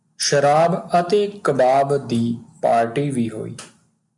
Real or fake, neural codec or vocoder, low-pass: real; none; 10.8 kHz